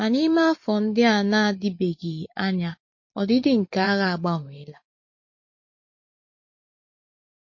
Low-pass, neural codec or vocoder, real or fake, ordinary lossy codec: 7.2 kHz; vocoder, 44.1 kHz, 128 mel bands every 512 samples, BigVGAN v2; fake; MP3, 32 kbps